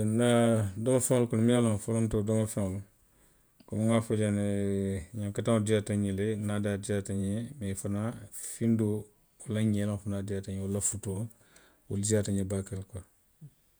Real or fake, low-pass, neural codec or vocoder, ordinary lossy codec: fake; none; vocoder, 48 kHz, 128 mel bands, Vocos; none